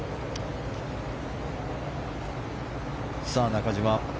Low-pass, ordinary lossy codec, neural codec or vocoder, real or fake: none; none; none; real